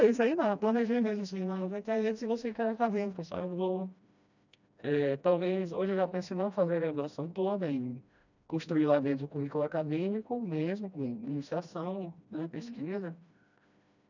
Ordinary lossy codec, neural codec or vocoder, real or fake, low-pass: none; codec, 16 kHz, 1 kbps, FreqCodec, smaller model; fake; 7.2 kHz